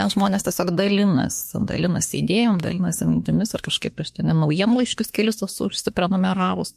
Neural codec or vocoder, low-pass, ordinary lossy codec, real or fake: autoencoder, 48 kHz, 32 numbers a frame, DAC-VAE, trained on Japanese speech; 14.4 kHz; MP3, 64 kbps; fake